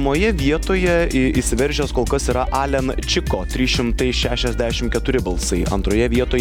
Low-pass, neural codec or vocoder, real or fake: 19.8 kHz; none; real